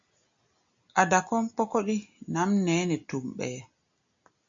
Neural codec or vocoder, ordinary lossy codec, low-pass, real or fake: none; MP3, 48 kbps; 7.2 kHz; real